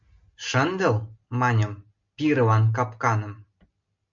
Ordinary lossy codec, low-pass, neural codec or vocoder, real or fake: MP3, 64 kbps; 7.2 kHz; none; real